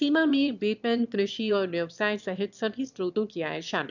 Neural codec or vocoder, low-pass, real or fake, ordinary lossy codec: autoencoder, 22.05 kHz, a latent of 192 numbers a frame, VITS, trained on one speaker; 7.2 kHz; fake; none